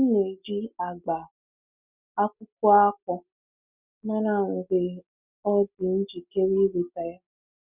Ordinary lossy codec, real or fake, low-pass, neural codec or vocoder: none; real; 3.6 kHz; none